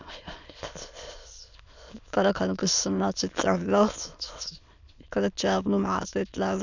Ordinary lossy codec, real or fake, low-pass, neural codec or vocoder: none; fake; 7.2 kHz; autoencoder, 22.05 kHz, a latent of 192 numbers a frame, VITS, trained on many speakers